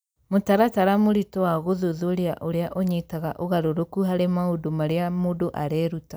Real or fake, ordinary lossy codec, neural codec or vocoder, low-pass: real; none; none; none